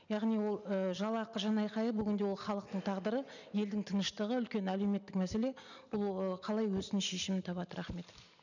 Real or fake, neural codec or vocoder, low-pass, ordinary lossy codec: real; none; 7.2 kHz; none